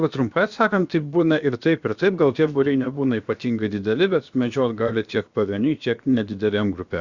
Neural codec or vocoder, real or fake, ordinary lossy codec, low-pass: codec, 16 kHz, about 1 kbps, DyCAST, with the encoder's durations; fake; Opus, 64 kbps; 7.2 kHz